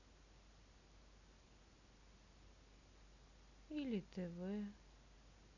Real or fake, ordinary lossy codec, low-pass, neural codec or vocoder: real; none; 7.2 kHz; none